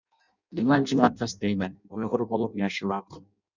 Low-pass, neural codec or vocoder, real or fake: 7.2 kHz; codec, 16 kHz in and 24 kHz out, 0.6 kbps, FireRedTTS-2 codec; fake